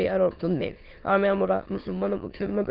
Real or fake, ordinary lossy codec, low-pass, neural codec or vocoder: fake; Opus, 16 kbps; 5.4 kHz; autoencoder, 22.05 kHz, a latent of 192 numbers a frame, VITS, trained on many speakers